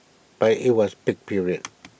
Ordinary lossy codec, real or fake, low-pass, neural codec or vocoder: none; real; none; none